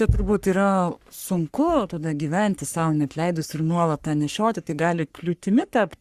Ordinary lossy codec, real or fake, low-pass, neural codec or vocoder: Opus, 64 kbps; fake; 14.4 kHz; codec, 44.1 kHz, 3.4 kbps, Pupu-Codec